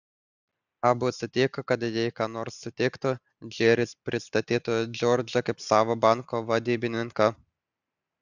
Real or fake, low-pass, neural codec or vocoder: real; 7.2 kHz; none